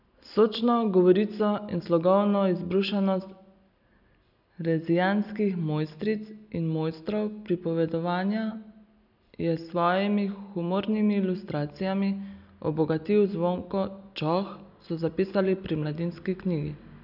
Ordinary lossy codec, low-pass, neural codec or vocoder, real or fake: none; 5.4 kHz; none; real